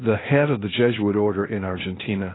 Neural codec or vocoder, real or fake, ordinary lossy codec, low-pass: codec, 16 kHz, 0.8 kbps, ZipCodec; fake; AAC, 16 kbps; 7.2 kHz